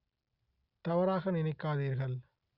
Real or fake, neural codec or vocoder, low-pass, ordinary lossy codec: real; none; 5.4 kHz; Opus, 64 kbps